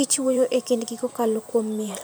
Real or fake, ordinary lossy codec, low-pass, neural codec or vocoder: fake; none; none; vocoder, 44.1 kHz, 128 mel bands every 512 samples, BigVGAN v2